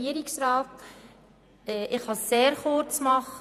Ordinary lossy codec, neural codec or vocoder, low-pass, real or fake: none; vocoder, 48 kHz, 128 mel bands, Vocos; 14.4 kHz; fake